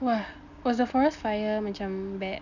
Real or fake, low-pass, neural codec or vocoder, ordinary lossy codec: real; 7.2 kHz; none; none